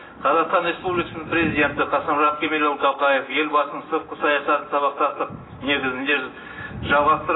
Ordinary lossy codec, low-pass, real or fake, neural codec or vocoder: AAC, 16 kbps; 7.2 kHz; real; none